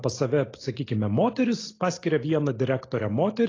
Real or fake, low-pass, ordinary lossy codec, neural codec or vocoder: real; 7.2 kHz; AAC, 32 kbps; none